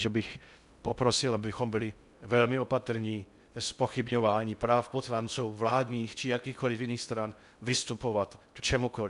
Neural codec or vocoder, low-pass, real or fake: codec, 16 kHz in and 24 kHz out, 0.6 kbps, FocalCodec, streaming, 2048 codes; 10.8 kHz; fake